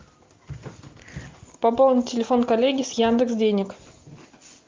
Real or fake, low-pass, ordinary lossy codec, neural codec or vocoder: real; 7.2 kHz; Opus, 24 kbps; none